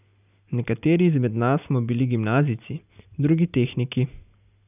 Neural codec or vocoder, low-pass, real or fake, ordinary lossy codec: none; 3.6 kHz; real; none